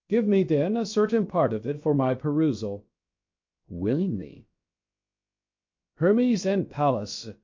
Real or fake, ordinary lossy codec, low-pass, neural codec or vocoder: fake; MP3, 48 kbps; 7.2 kHz; codec, 16 kHz, about 1 kbps, DyCAST, with the encoder's durations